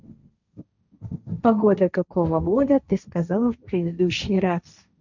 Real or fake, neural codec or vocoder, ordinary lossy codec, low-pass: fake; codec, 16 kHz, 1.1 kbps, Voila-Tokenizer; none; 7.2 kHz